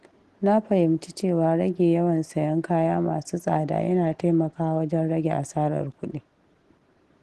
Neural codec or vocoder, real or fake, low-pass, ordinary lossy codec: none; real; 10.8 kHz; Opus, 16 kbps